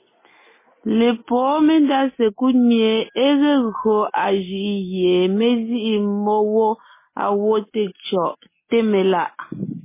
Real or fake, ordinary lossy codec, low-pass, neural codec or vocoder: real; MP3, 16 kbps; 3.6 kHz; none